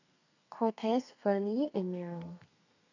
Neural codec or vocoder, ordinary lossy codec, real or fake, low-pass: codec, 32 kHz, 1.9 kbps, SNAC; none; fake; 7.2 kHz